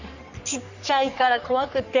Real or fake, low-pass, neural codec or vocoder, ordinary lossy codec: fake; 7.2 kHz; codec, 44.1 kHz, 3.4 kbps, Pupu-Codec; none